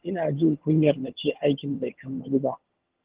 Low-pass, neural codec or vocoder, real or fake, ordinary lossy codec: 3.6 kHz; vocoder, 22.05 kHz, 80 mel bands, HiFi-GAN; fake; Opus, 16 kbps